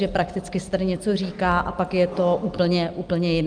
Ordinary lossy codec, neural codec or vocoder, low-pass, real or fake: Opus, 32 kbps; none; 9.9 kHz; real